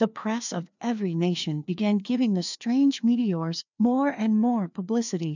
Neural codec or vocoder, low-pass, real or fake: codec, 16 kHz, 2 kbps, FreqCodec, larger model; 7.2 kHz; fake